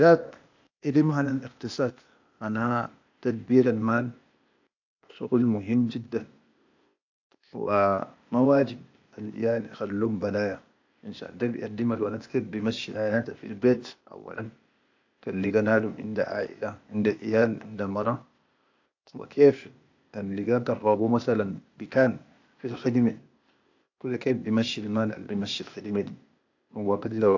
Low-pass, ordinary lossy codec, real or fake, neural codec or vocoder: 7.2 kHz; AAC, 48 kbps; fake; codec, 16 kHz, 0.8 kbps, ZipCodec